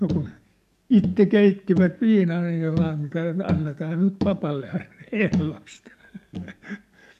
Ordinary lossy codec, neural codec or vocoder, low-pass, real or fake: none; codec, 44.1 kHz, 7.8 kbps, DAC; 14.4 kHz; fake